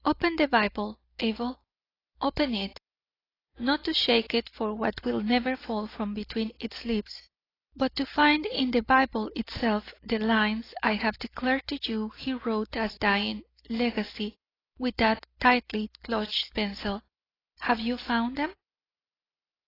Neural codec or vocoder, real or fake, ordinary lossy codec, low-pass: none; real; AAC, 24 kbps; 5.4 kHz